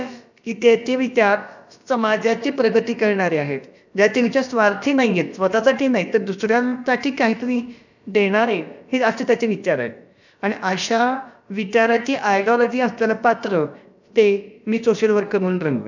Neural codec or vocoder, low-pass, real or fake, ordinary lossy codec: codec, 16 kHz, about 1 kbps, DyCAST, with the encoder's durations; 7.2 kHz; fake; none